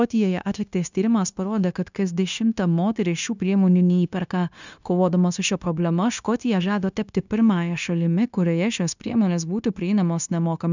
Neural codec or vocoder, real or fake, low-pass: codec, 16 kHz, 0.9 kbps, LongCat-Audio-Codec; fake; 7.2 kHz